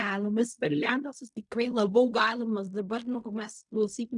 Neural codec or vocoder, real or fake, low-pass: codec, 16 kHz in and 24 kHz out, 0.4 kbps, LongCat-Audio-Codec, fine tuned four codebook decoder; fake; 10.8 kHz